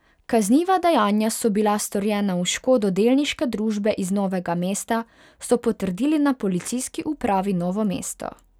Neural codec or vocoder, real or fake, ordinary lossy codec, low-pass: none; real; none; 19.8 kHz